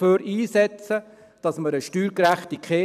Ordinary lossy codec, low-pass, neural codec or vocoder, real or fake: none; 14.4 kHz; vocoder, 44.1 kHz, 128 mel bands every 256 samples, BigVGAN v2; fake